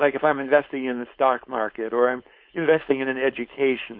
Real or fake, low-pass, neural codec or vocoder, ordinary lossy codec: fake; 5.4 kHz; codec, 16 kHz, 4.8 kbps, FACodec; MP3, 48 kbps